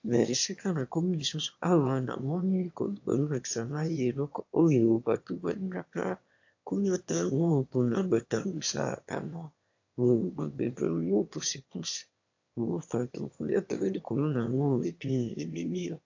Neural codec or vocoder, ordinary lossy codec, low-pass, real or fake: autoencoder, 22.05 kHz, a latent of 192 numbers a frame, VITS, trained on one speaker; AAC, 48 kbps; 7.2 kHz; fake